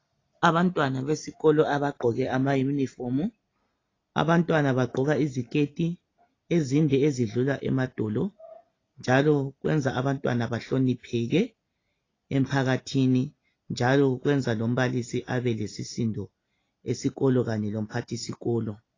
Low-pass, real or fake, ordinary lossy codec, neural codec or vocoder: 7.2 kHz; real; AAC, 32 kbps; none